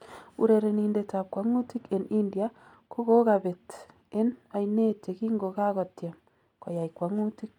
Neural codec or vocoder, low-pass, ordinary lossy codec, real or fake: none; 19.8 kHz; MP3, 96 kbps; real